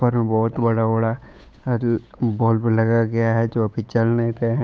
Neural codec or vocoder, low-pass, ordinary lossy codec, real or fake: codec, 16 kHz, 8 kbps, FunCodec, trained on Chinese and English, 25 frames a second; none; none; fake